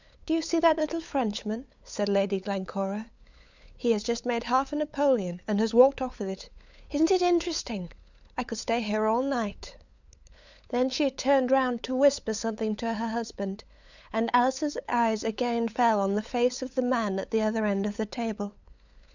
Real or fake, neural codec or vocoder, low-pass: fake; codec, 16 kHz, 8 kbps, FunCodec, trained on LibriTTS, 25 frames a second; 7.2 kHz